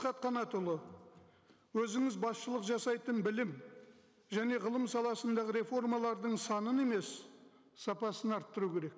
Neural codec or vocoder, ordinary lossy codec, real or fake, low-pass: none; none; real; none